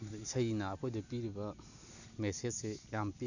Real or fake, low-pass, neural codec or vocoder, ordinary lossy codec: real; 7.2 kHz; none; none